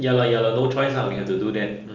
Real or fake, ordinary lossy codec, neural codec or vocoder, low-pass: real; Opus, 24 kbps; none; 7.2 kHz